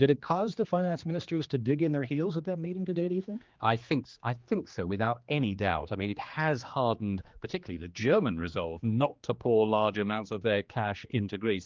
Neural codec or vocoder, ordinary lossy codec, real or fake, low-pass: codec, 16 kHz, 2 kbps, X-Codec, HuBERT features, trained on general audio; Opus, 24 kbps; fake; 7.2 kHz